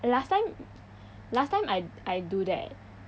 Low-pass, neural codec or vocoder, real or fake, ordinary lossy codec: none; none; real; none